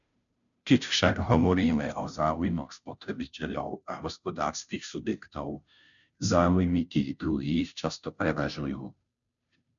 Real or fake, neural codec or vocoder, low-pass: fake; codec, 16 kHz, 0.5 kbps, FunCodec, trained on Chinese and English, 25 frames a second; 7.2 kHz